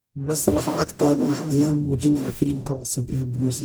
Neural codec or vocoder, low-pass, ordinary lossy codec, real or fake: codec, 44.1 kHz, 0.9 kbps, DAC; none; none; fake